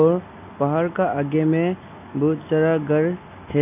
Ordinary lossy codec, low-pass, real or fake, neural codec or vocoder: none; 3.6 kHz; real; none